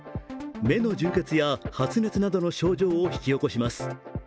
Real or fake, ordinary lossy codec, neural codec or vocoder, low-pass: real; none; none; none